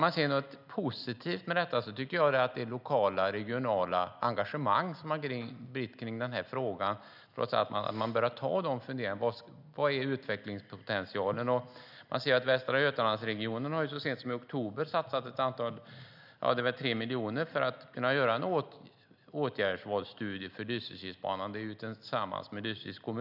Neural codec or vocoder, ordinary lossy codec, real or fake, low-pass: none; none; real; 5.4 kHz